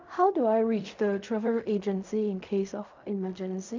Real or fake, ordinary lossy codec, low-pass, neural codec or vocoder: fake; MP3, 64 kbps; 7.2 kHz; codec, 16 kHz in and 24 kHz out, 0.4 kbps, LongCat-Audio-Codec, fine tuned four codebook decoder